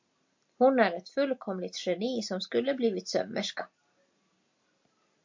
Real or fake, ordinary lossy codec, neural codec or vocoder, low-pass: real; MP3, 48 kbps; none; 7.2 kHz